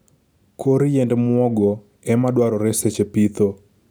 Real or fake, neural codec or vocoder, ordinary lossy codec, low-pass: real; none; none; none